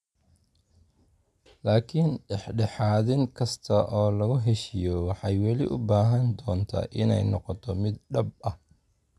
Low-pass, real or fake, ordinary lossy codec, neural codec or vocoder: none; real; none; none